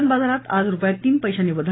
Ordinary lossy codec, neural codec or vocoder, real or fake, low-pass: AAC, 16 kbps; none; real; 7.2 kHz